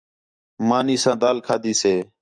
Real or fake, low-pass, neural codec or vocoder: fake; 9.9 kHz; codec, 44.1 kHz, 7.8 kbps, DAC